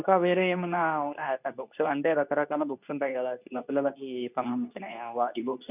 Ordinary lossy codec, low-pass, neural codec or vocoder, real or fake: none; 3.6 kHz; codec, 24 kHz, 0.9 kbps, WavTokenizer, medium speech release version 2; fake